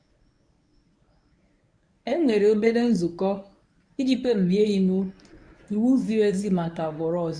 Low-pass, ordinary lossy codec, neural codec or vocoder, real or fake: 9.9 kHz; none; codec, 24 kHz, 0.9 kbps, WavTokenizer, medium speech release version 1; fake